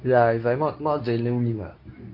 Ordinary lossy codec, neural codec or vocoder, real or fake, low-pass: none; codec, 24 kHz, 0.9 kbps, WavTokenizer, medium speech release version 2; fake; 5.4 kHz